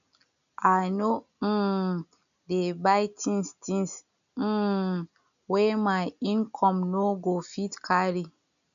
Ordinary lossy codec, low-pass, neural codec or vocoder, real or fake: none; 7.2 kHz; none; real